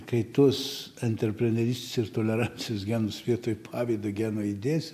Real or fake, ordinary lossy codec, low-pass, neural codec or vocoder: real; AAC, 96 kbps; 14.4 kHz; none